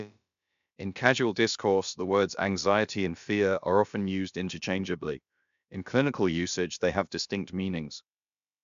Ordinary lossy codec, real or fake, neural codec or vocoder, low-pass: MP3, 64 kbps; fake; codec, 16 kHz, about 1 kbps, DyCAST, with the encoder's durations; 7.2 kHz